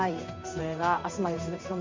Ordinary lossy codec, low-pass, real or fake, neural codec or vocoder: MP3, 64 kbps; 7.2 kHz; fake; codec, 16 kHz in and 24 kHz out, 1 kbps, XY-Tokenizer